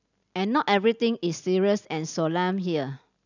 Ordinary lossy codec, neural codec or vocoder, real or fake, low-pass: none; none; real; 7.2 kHz